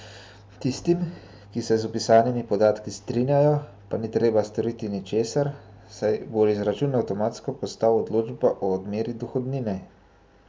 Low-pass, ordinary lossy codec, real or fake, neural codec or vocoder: none; none; real; none